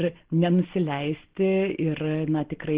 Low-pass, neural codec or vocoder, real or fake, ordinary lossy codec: 3.6 kHz; none; real; Opus, 16 kbps